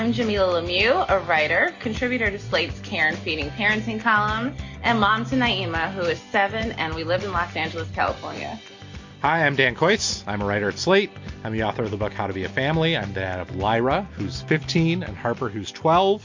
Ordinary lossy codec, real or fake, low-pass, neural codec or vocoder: MP3, 48 kbps; real; 7.2 kHz; none